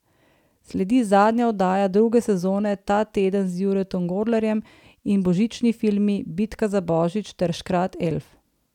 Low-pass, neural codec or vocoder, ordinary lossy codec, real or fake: 19.8 kHz; none; none; real